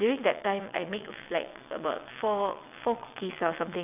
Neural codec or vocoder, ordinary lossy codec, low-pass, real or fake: vocoder, 22.05 kHz, 80 mel bands, WaveNeXt; none; 3.6 kHz; fake